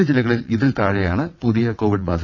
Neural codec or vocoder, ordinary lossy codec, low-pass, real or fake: vocoder, 22.05 kHz, 80 mel bands, WaveNeXt; none; 7.2 kHz; fake